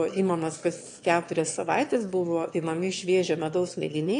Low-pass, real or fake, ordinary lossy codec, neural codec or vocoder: 9.9 kHz; fake; AAC, 48 kbps; autoencoder, 22.05 kHz, a latent of 192 numbers a frame, VITS, trained on one speaker